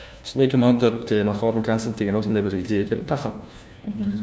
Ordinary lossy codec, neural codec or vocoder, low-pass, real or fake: none; codec, 16 kHz, 1 kbps, FunCodec, trained on LibriTTS, 50 frames a second; none; fake